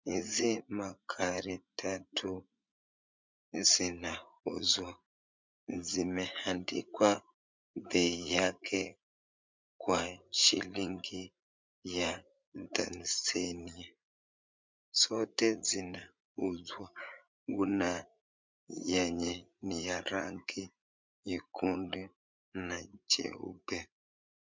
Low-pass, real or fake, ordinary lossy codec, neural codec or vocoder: 7.2 kHz; fake; MP3, 64 kbps; vocoder, 44.1 kHz, 80 mel bands, Vocos